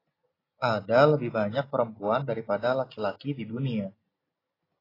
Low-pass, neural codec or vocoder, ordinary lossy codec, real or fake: 5.4 kHz; none; AAC, 24 kbps; real